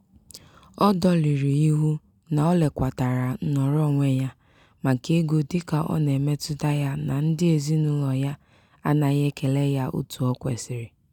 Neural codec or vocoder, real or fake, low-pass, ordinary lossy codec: none; real; 19.8 kHz; none